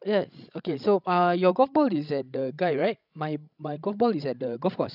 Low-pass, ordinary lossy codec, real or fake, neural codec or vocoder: 5.4 kHz; none; fake; codec, 16 kHz, 16 kbps, FreqCodec, larger model